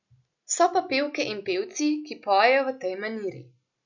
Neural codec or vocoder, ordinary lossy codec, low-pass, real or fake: none; none; 7.2 kHz; real